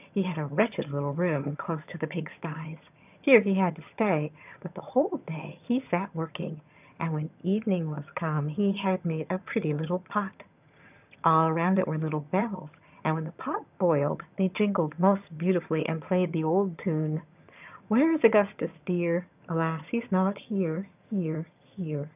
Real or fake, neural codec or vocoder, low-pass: fake; vocoder, 22.05 kHz, 80 mel bands, HiFi-GAN; 3.6 kHz